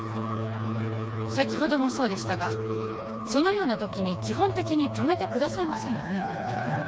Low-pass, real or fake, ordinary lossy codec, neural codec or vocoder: none; fake; none; codec, 16 kHz, 2 kbps, FreqCodec, smaller model